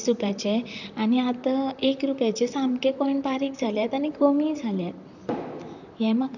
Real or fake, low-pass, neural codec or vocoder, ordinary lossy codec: fake; 7.2 kHz; vocoder, 44.1 kHz, 128 mel bands, Pupu-Vocoder; none